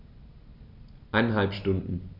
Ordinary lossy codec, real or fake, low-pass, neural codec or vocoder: none; real; 5.4 kHz; none